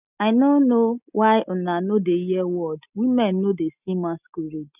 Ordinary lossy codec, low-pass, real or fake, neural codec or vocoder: none; 3.6 kHz; real; none